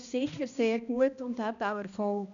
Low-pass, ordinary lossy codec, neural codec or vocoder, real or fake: 7.2 kHz; none; codec, 16 kHz, 1 kbps, X-Codec, HuBERT features, trained on balanced general audio; fake